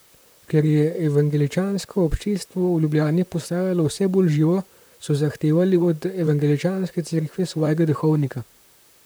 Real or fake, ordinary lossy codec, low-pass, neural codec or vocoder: fake; none; none; vocoder, 44.1 kHz, 128 mel bands, Pupu-Vocoder